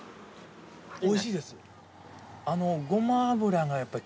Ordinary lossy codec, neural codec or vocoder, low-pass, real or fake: none; none; none; real